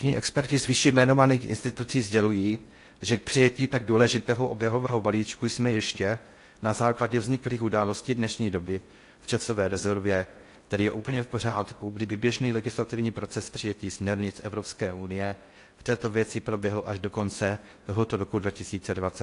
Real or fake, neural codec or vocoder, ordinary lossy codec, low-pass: fake; codec, 16 kHz in and 24 kHz out, 0.6 kbps, FocalCodec, streaming, 4096 codes; AAC, 48 kbps; 10.8 kHz